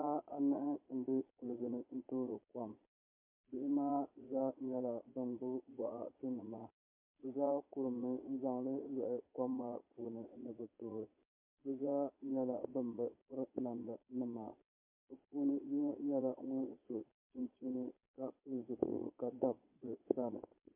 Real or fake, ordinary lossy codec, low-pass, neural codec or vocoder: fake; MP3, 32 kbps; 3.6 kHz; vocoder, 22.05 kHz, 80 mel bands, Vocos